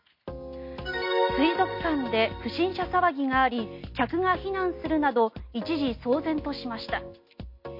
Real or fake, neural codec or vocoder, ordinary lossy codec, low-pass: real; none; MP3, 32 kbps; 5.4 kHz